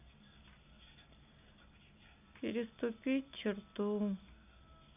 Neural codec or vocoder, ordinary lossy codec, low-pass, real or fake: none; none; 3.6 kHz; real